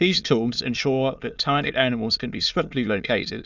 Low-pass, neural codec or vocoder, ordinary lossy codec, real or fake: 7.2 kHz; autoencoder, 22.05 kHz, a latent of 192 numbers a frame, VITS, trained on many speakers; Opus, 64 kbps; fake